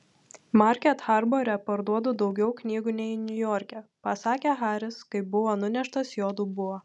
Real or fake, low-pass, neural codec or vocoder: real; 10.8 kHz; none